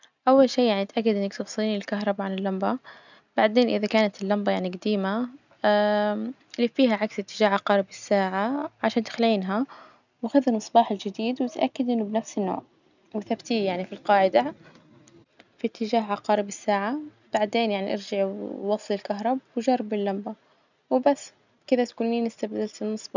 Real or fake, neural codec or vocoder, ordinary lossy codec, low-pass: real; none; none; 7.2 kHz